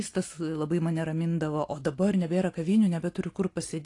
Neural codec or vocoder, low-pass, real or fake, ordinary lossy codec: none; 10.8 kHz; real; AAC, 48 kbps